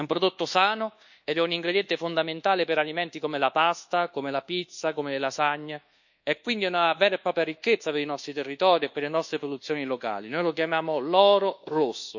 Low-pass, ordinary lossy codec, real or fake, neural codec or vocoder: 7.2 kHz; none; fake; codec, 24 kHz, 1.2 kbps, DualCodec